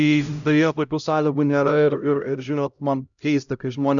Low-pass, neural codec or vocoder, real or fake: 7.2 kHz; codec, 16 kHz, 0.5 kbps, X-Codec, HuBERT features, trained on LibriSpeech; fake